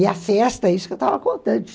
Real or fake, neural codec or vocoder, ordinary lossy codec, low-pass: real; none; none; none